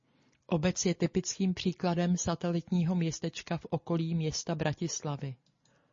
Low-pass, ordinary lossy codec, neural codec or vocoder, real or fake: 7.2 kHz; MP3, 32 kbps; none; real